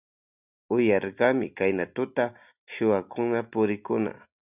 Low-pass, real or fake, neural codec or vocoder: 3.6 kHz; real; none